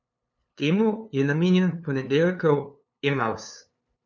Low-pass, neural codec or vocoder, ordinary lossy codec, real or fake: 7.2 kHz; codec, 16 kHz, 2 kbps, FunCodec, trained on LibriTTS, 25 frames a second; none; fake